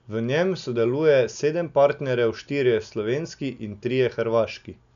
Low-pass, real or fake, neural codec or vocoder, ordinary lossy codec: 7.2 kHz; real; none; MP3, 96 kbps